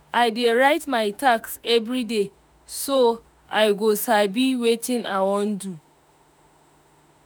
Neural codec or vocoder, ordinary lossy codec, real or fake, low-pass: autoencoder, 48 kHz, 32 numbers a frame, DAC-VAE, trained on Japanese speech; none; fake; none